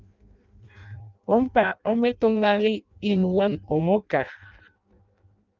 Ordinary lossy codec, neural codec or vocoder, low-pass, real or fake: Opus, 24 kbps; codec, 16 kHz in and 24 kHz out, 0.6 kbps, FireRedTTS-2 codec; 7.2 kHz; fake